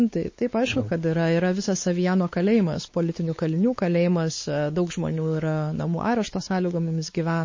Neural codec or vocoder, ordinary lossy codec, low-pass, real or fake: codec, 16 kHz, 4 kbps, X-Codec, WavLM features, trained on Multilingual LibriSpeech; MP3, 32 kbps; 7.2 kHz; fake